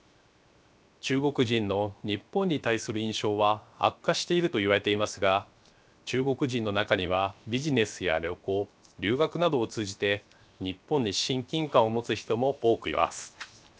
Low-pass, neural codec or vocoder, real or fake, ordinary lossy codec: none; codec, 16 kHz, 0.7 kbps, FocalCodec; fake; none